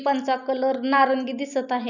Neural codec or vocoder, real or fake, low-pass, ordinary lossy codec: none; real; 7.2 kHz; none